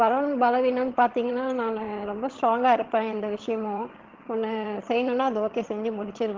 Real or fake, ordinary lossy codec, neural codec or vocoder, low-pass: fake; Opus, 16 kbps; vocoder, 22.05 kHz, 80 mel bands, HiFi-GAN; 7.2 kHz